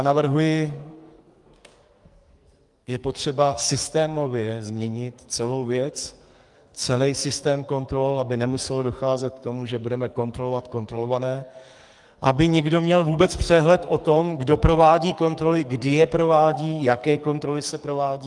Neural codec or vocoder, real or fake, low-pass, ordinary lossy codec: codec, 44.1 kHz, 2.6 kbps, SNAC; fake; 10.8 kHz; Opus, 32 kbps